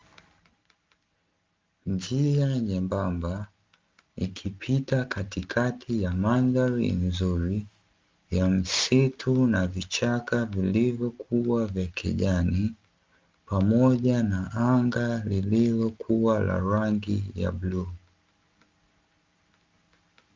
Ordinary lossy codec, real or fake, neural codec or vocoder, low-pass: Opus, 32 kbps; real; none; 7.2 kHz